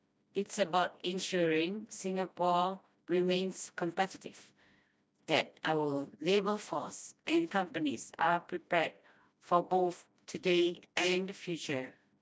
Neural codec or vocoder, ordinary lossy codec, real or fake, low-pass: codec, 16 kHz, 1 kbps, FreqCodec, smaller model; none; fake; none